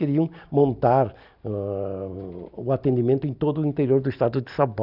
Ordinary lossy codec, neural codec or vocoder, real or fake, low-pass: none; none; real; 5.4 kHz